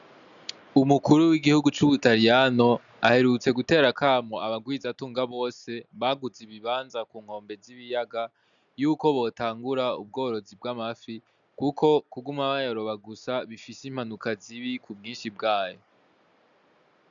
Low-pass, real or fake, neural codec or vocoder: 7.2 kHz; real; none